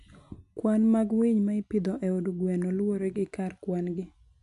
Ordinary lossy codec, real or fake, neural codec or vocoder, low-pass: none; real; none; 10.8 kHz